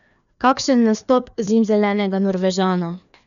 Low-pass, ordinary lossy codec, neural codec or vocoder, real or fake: 7.2 kHz; none; codec, 16 kHz, 2 kbps, FreqCodec, larger model; fake